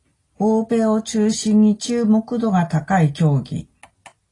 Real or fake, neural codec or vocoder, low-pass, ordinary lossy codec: real; none; 10.8 kHz; AAC, 32 kbps